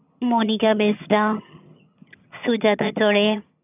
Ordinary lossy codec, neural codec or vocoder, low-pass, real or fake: none; vocoder, 22.05 kHz, 80 mel bands, HiFi-GAN; 3.6 kHz; fake